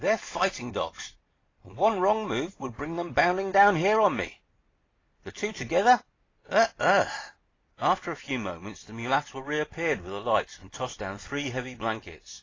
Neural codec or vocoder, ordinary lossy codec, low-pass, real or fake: none; AAC, 32 kbps; 7.2 kHz; real